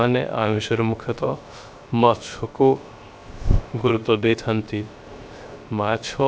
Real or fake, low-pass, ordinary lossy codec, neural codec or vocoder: fake; none; none; codec, 16 kHz, 0.3 kbps, FocalCodec